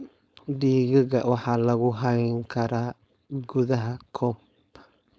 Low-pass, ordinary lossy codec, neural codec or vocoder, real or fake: none; none; codec, 16 kHz, 4.8 kbps, FACodec; fake